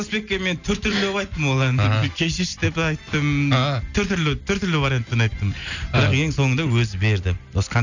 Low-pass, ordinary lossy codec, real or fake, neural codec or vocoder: 7.2 kHz; none; real; none